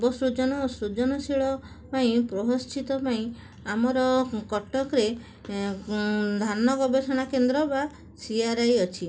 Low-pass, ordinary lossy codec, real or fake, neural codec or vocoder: none; none; real; none